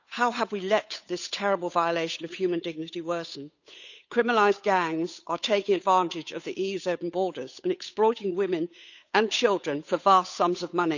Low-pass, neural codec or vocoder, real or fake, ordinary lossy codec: 7.2 kHz; codec, 16 kHz, 8 kbps, FunCodec, trained on Chinese and English, 25 frames a second; fake; none